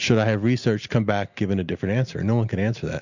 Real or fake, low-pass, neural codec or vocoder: real; 7.2 kHz; none